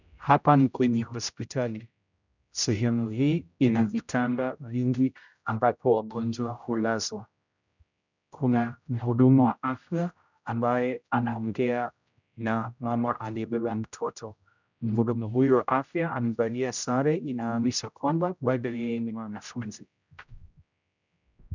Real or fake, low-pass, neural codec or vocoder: fake; 7.2 kHz; codec, 16 kHz, 0.5 kbps, X-Codec, HuBERT features, trained on general audio